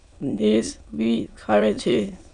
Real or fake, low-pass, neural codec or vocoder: fake; 9.9 kHz; autoencoder, 22.05 kHz, a latent of 192 numbers a frame, VITS, trained on many speakers